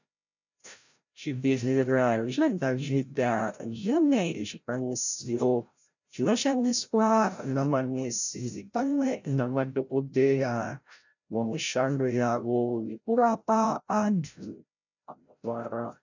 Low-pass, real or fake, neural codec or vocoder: 7.2 kHz; fake; codec, 16 kHz, 0.5 kbps, FreqCodec, larger model